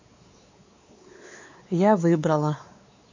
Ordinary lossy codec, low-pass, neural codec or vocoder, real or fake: AAC, 48 kbps; 7.2 kHz; codec, 16 kHz, 4 kbps, X-Codec, WavLM features, trained on Multilingual LibriSpeech; fake